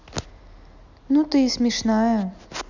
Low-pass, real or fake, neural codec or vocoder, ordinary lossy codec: 7.2 kHz; real; none; none